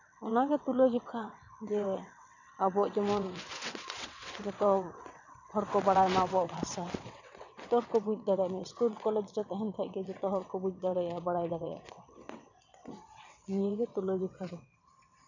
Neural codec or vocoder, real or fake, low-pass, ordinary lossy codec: vocoder, 22.05 kHz, 80 mel bands, WaveNeXt; fake; 7.2 kHz; none